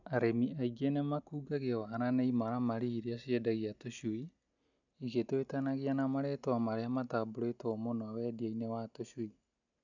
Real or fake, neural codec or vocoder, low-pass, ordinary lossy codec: real; none; 7.2 kHz; none